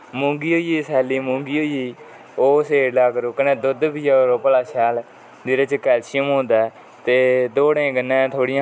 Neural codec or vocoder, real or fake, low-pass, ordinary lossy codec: none; real; none; none